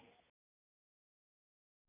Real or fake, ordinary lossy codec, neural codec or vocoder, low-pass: real; none; none; 3.6 kHz